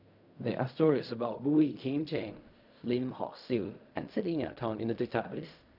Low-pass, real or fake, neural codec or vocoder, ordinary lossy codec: 5.4 kHz; fake; codec, 16 kHz in and 24 kHz out, 0.4 kbps, LongCat-Audio-Codec, fine tuned four codebook decoder; none